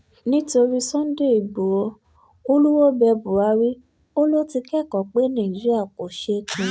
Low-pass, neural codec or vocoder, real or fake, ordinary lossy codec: none; none; real; none